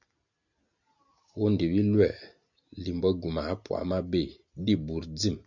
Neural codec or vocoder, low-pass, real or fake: none; 7.2 kHz; real